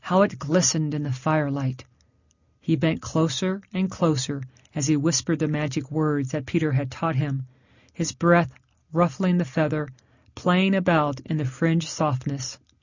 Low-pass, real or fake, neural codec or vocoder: 7.2 kHz; real; none